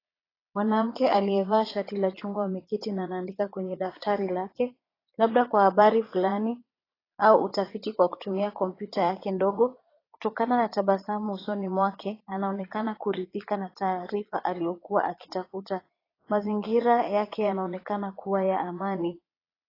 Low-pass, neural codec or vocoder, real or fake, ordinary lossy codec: 5.4 kHz; vocoder, 22.05 kHz, 80 mel bands, Vocos; fake; AAC, 24 kbps